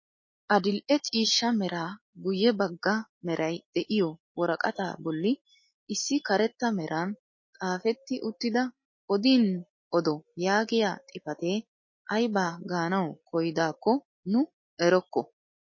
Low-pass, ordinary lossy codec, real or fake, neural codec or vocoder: 7.2 kHz; MP3, 32 kbps; real; none